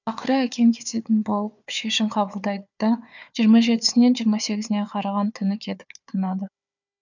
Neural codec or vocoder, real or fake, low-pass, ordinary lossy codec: codec, 16 kHz, 4 kbps, FunCodec, trained on Chinese and English, 50 frames a second; fake; 7.2 kHz; none